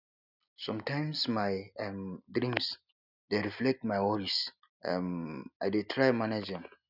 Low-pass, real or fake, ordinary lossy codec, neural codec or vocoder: 5.4 kHz; real; none; none